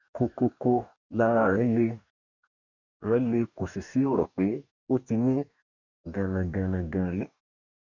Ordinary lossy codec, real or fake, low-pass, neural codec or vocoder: none; fake; 7.2 kHz; codec, 44.1 kHz, 2.6 kbps, DAC